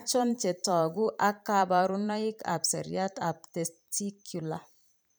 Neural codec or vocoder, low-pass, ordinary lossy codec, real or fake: vocoder, 44.1 kHz, 128 mel bands every 512 samples, BigVGAN v2; none; none; fake